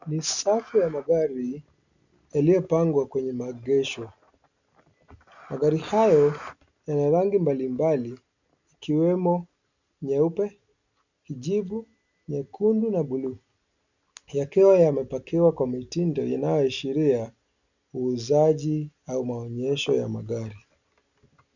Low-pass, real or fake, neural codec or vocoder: 7.2 kHz; real; none